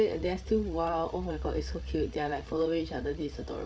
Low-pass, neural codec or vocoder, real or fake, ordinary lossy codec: none; codec, 16 kHz, 8 kbps, FreqCodec, larger model; fake; none